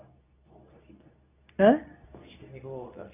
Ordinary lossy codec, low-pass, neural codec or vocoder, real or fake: none; 3.6 kHz; codec, 24 kHz, 0.9 kbps, WavTokenizer, medium speech release version 2; fake